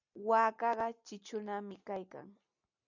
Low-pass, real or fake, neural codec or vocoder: 7.2 kHz; real; none